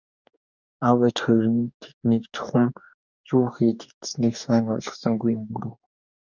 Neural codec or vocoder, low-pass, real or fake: codec, 44.1 kHz, 2.6 kbps, DAC; 7.2 kHz; fake